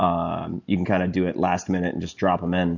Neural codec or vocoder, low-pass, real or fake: none; 7.2 kHz; real